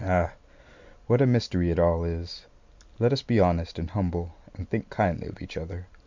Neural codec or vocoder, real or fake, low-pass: none; real; 7.2 kHz